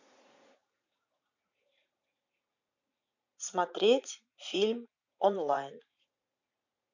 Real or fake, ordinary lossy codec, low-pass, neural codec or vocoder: fake; none; 7.2 kHz; vocoder, 44.1 kHz, 80 mel bands, Vocos